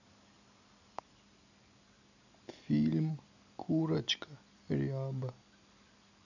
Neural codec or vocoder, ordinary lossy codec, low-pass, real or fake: none; none; 7.2 kHz; real